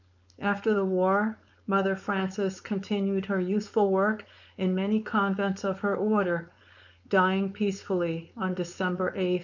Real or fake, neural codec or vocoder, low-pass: fake; codec, 16 kHz, 4.8 kbps, FACodec; 7.2 kHz